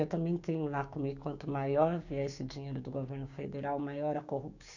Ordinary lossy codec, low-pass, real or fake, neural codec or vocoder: none; 7.2 kHz; fake; codec, 16 kHz, 6 kbps, DAC